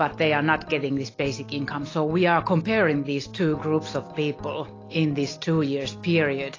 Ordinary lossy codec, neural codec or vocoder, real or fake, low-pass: AAC, 32 kbps; none; real; 7.2 kHz